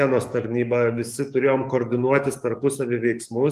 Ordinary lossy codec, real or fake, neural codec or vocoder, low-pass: Opus, 32 kbps; fake; codec, 44.1 kHz, 7.8 kbps, DAC; 14.4 kHz